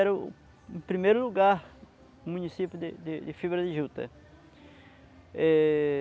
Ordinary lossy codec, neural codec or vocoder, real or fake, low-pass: none; none; real; none